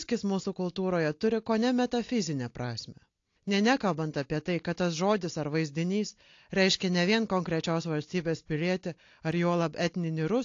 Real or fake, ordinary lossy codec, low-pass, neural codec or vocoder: real; AAC, 48 kbps; 7.2 kHz; none